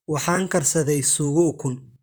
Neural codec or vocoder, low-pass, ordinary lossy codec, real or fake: vocoder, 44.1 kHz, 128 mel bands, Pupu-Vocoder; none; none; fake